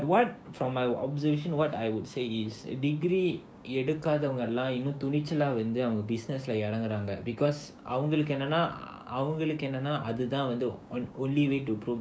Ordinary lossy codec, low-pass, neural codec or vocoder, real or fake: none; none; codec, 16 kHz, 6 kbps, DAC; fake